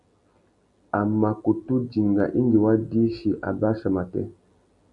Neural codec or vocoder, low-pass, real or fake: none; 10.8 kHz; real